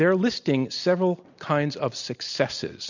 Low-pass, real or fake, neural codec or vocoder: 7.2 kHz; real; none